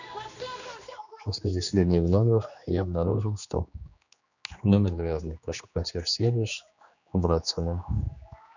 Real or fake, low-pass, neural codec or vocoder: fake; 7.2 kHz; codec, 16 kHz, 2 kbps, X-Codec, HuBERT features, trained on general audio